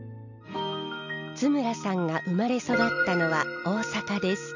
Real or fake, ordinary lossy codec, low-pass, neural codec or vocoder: real; none; 7.2 kHz; none